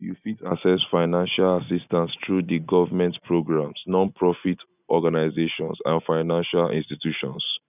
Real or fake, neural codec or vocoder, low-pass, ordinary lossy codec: real; none; 3.6 kHz; none